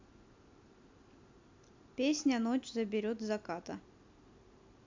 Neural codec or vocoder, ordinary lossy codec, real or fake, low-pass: none; MP3, 64 kbps; real; 7.2 kHz